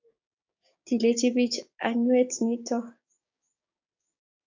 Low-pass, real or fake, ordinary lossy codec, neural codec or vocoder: 7.2 kHz; fake; AAC, 48 kbps; codec, 16 kHz, 6 kbps, DAC